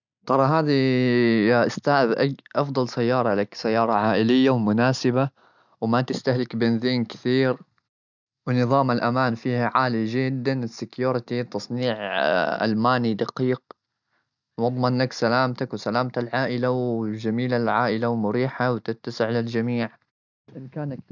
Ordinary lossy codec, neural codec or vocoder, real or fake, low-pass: none; none; real; 7.2 kHz